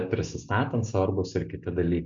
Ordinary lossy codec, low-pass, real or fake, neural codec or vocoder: MP3, 64 kbps; 7.2 kHz; real; none